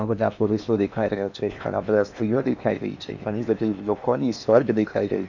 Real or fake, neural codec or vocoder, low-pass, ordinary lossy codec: fake; codec, 16 kHz in and 24 kHz out, 0.8 kbps, FocalCodec, streaming, 65536 codes; 7.2 kHz; none